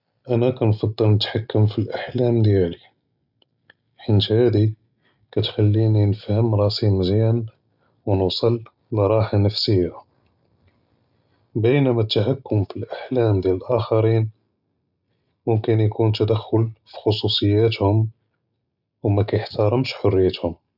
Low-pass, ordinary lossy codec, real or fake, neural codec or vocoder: 5.4 kHz; none; real; none